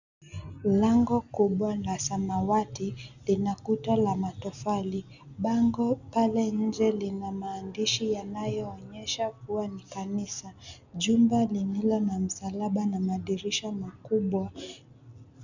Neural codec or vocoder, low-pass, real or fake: none; 7.2 kHz; real